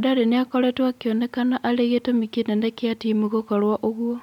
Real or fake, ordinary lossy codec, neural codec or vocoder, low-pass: real; none; none; 19.8 kHz